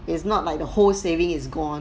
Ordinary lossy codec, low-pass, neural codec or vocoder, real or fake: none; none; none; real